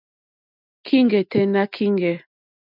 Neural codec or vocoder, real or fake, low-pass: none; real; 5.4 kHz